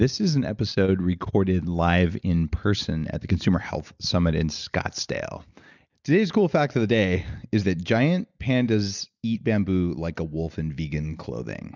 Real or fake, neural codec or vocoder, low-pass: real; none; 7.2 kHz